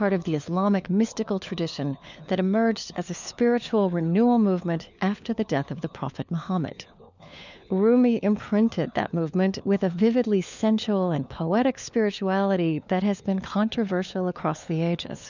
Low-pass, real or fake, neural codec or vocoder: 7.2 kHz; fake; codec, 16 kHz, 4 kbps, FunCodec, trained on LibriTTS, 50 frames a second